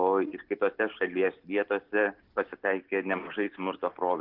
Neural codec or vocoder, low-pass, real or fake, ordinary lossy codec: none; 5.4 kHz; real; Opus, 16 kbps